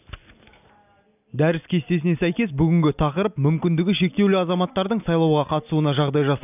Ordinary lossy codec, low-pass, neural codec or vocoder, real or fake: none; 3.6 kHz; none; real